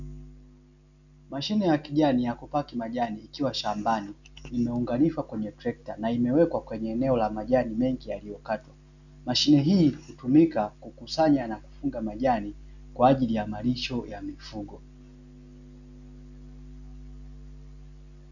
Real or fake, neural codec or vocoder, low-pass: real; none; 7.2 kHz